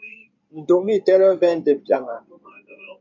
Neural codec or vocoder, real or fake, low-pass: codec, 16 kHz in and 24 kHz out, 2.2 kbps, FireRedTTS-2 codec; fake; 7.2 kHz